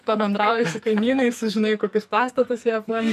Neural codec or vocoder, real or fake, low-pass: codec, 44.1 kHz, 2.6 kbps, SNAC; fake; 14.4 kHz